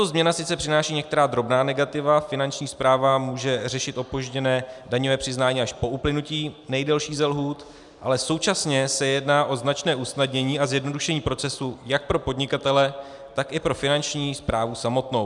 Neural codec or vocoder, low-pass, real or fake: none; 10.8 kHz; real